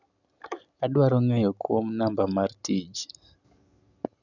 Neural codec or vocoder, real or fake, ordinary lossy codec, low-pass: none; real; none; 7.2 kHz